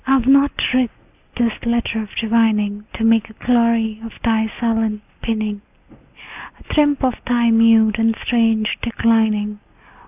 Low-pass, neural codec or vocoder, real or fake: 3.6 kHz; none; real